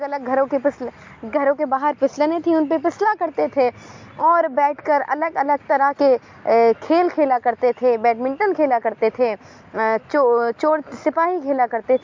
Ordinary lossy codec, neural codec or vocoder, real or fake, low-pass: MP3, 48 kbps; none; real; 7.2 kHz